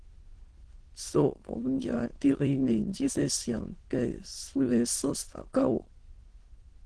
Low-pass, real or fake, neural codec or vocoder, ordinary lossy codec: 9.9 kHz; fake; autoencoder, 22.05 kHz, a latent of 192 numbers a frame, VITS, trained on many speakers; Opus, 16 kbps